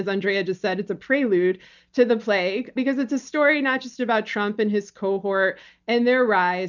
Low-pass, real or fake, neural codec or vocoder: 7.2 kHz; real; none